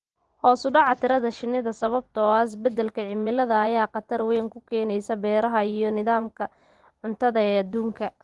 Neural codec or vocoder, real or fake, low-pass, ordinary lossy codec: vocoder, 24 kHz, 100 mel bands, Vocos; fake; 10.8 kHz; Opus, 24 kbps